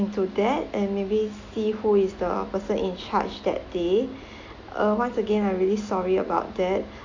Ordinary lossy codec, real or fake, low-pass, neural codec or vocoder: AAC, 48 kbps; real; 7.2 kHz; none